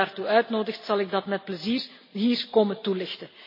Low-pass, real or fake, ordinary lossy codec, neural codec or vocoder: 5.4 kHz; real; MP3, 24 kbps; none